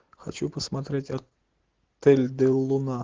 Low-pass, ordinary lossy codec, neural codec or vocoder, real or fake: 7.2 kHz; Opus, 16 kbps; none; real